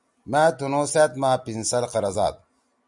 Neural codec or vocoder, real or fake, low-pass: none; real; 10.8 kHz